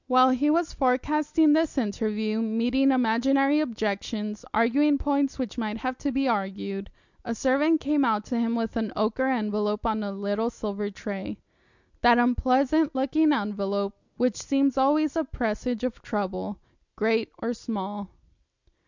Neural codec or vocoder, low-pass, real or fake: none; 7.2 kHz; real